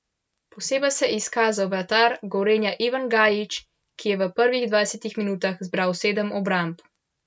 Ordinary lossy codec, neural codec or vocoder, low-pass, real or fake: none; none; none; real